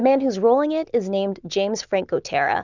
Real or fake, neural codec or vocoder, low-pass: real; none; 7.2 kHz